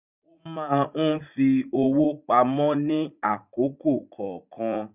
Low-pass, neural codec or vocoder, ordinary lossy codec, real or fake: 3.6 kHz; vocoder, 44.1 kHz, 80 mel bands, Vocos; none; fake